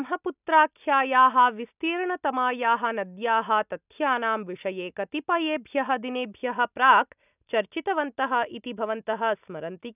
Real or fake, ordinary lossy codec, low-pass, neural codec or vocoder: real; none; 3.6 kHz; none